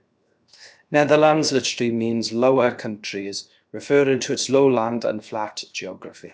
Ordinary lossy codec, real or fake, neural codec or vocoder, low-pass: none; fake; codec, 16 kHz, 0.7 kbps, FocalCodec; none